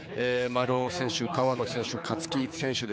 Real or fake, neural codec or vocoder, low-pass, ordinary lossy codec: fake; codec, 16 kHz, 4 kbps, X-Codec, HuBERT features, trained on general audio; none; none